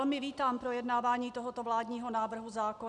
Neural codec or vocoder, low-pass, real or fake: none; 10.8 kHz; real